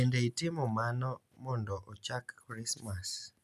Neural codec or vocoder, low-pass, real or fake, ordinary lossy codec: none; 14.4 kHz; real; none